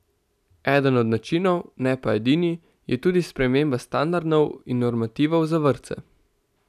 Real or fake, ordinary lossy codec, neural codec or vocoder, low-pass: real; none; none; 14.4 kHz